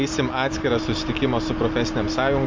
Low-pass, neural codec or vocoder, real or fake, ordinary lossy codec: 7.2 kHz; none; real; MP3, 48 kbps